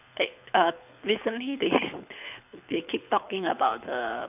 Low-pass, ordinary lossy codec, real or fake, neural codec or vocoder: 3.6 kHz; none; fake; codec, 24 kHz, 6 kbps, HILCodec